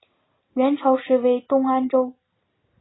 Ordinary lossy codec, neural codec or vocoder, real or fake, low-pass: AAC, 16 kbps; none; real; 7.2 kHz